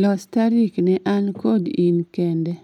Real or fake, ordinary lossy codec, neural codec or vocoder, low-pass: real; none; none; 19.8 kHz